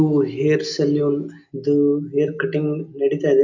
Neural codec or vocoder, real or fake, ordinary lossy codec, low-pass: none; real; none; 7.2 kHz